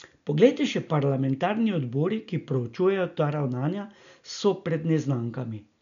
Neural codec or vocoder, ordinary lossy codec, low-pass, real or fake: none; none; 7.2 kHz; real